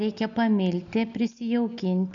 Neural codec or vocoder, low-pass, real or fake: none; 7.2 kHz; real